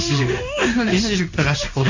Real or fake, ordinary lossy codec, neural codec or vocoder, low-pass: fake; Opus, 64 kbps; codec, 16 kHz in and 24 kHz out, 1 kbps, XY-Tokenizer; 7.2 kHz